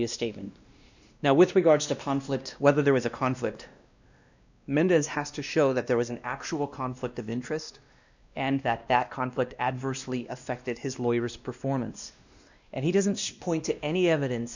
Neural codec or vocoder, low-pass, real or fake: codec, 16 kHz, 1 kbps, X-Codec, WavLM features, trained on Multilingual LibriSpeech; 7.2 kHz; fake